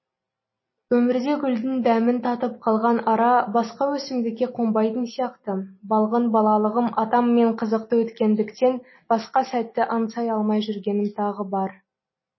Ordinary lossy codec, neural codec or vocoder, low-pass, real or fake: MP3, 24 kbps; none; 7.2 kHz; real